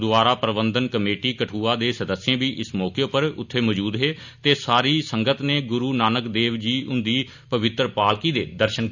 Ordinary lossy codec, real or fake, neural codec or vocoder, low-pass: none; real; none; 7.2 kHz